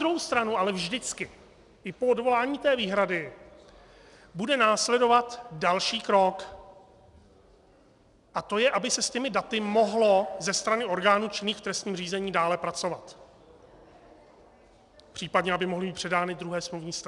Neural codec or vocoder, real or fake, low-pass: none; real; 10.8 kHz